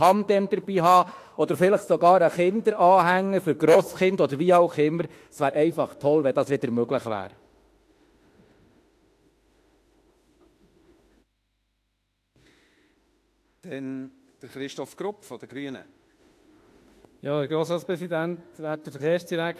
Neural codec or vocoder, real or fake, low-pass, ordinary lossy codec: autoencoder, 48 kHz, 32 numbers a frame, DAC-VAE, trained on Japanese speech; fake; 14.4 kHz; AAC, 64 kbps